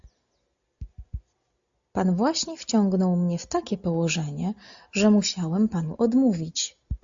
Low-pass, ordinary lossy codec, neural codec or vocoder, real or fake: 7.2 kHz; AAC, 64 kbps; none; real